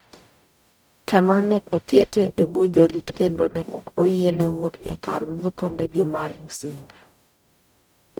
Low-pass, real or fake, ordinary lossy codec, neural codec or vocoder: none; fake; none; codec, 44.1 kHz, 0.9 kbps, DAC